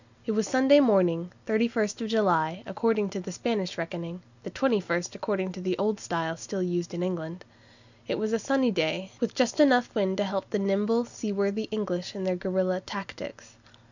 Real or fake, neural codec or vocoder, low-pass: real; none; 7.2 kHz